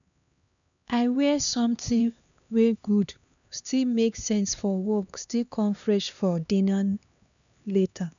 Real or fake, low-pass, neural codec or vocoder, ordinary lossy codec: fake; 7.2 kHz; codec, 16 kHz, 2 kbps, X-Codec, HuBERT features, trained on LibriSpeech; none